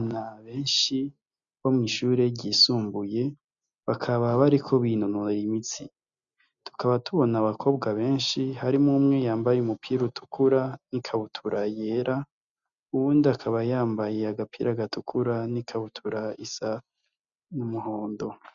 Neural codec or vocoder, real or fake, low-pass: none; real; 7.2 kHz